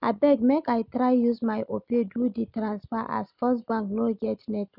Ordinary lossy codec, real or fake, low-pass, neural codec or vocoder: none; real; 5.4 kHz; none